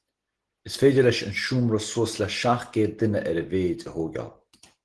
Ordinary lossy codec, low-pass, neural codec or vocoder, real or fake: Opus, 16 kbps; 10.8 kHz; none; real